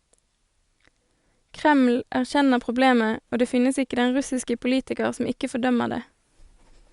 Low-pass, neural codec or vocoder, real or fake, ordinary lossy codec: 10.8 kHz; none; real; Opus, 64 kbps